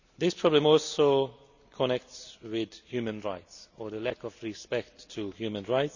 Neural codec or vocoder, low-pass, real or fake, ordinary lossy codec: none; 7.2 kHz; real; none